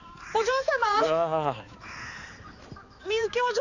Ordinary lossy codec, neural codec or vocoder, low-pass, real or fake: none; codec, 16 kHz, 4 kbps, X-Codec, HuBERT features, trained on balanced general audio; 7.2 kHz; fake